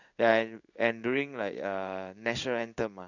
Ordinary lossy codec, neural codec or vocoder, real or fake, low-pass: none; codec, 16 kHz in and 24 kHz out, 1 kbps, XY-Tokenizer; fake; 7.2 kHz